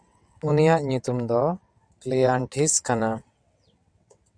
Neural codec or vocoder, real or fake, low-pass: vocoder, 22.05 kHz, 80 mel bands, WaveNeXt; fake; 9.9 kHz